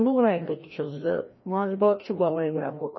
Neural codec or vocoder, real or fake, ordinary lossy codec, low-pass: codec, 16 kHz, 1 kbps, FreqCodec, larger model; fake; MP3, 24 kbps; 7.2 kHz